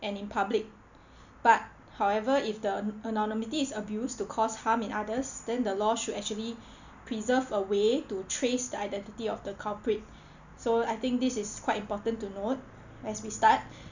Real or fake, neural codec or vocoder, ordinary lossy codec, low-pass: real; none; none; 7.2 kHz